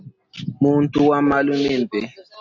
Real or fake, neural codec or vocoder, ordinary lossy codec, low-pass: real; none; AAC, 48 kbps; 7.2 kHz